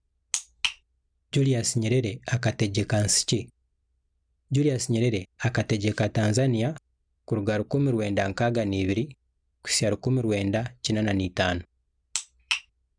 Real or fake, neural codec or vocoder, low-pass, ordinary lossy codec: real; none; 9.9 kHz; none